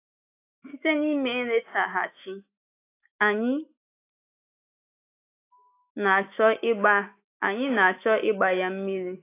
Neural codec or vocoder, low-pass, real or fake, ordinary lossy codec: autoencoder, 48 kHz, 128 numbers a frame, DAC-VAE, trained on Japanese speech; 3.6 kHz; fake; AAC, 24 kbps